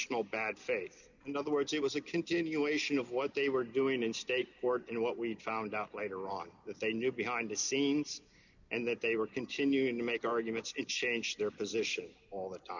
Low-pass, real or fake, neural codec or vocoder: 7.2 kHz; real; none